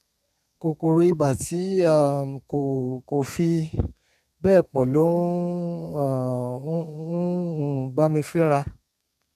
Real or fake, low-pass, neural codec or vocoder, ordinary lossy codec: fake; 14.4 kHz; codec, 32 kHz, 1.9 kbps, SNAC; none